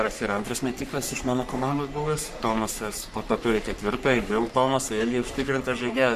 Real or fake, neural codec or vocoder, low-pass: fake; codec, 44.1 kHz, 3.4 kbps, Pupu-Codec; 14.4 kHz